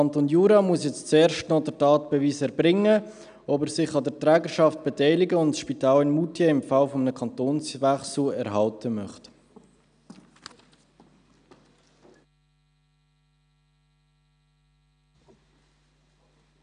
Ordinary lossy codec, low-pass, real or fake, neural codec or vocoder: none; 9.9 kHz; real; none